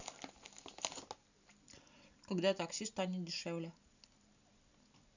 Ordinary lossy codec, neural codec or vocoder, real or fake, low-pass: none; none; real; 7.2 kHz